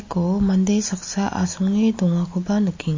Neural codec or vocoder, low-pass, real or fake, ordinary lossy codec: none; 7.2 kHz; real; MP3, 32 kbps